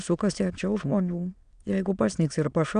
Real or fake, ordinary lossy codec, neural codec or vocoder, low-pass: fake; MP3, 96 kbps; autoencoder, 22.05 kHz, a latent of 192 numbers a frame, VITS, trained on many speakers; 9.9 kHz